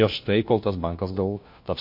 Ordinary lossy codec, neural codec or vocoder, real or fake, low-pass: MP3, 32 kbps; codec, 16 kHz, 1 kbps, FunCodec, trained on LibriTTS, 50 frames a second; fake; 5.4 kHz